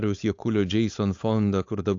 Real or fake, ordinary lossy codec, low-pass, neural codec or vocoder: fake; AAC, 64 kbps; 7.2 kHz; codec, 16 kHz, 4.8 kbps, FACodec